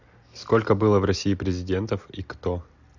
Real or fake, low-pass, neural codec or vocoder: real; 7.2 kHz; none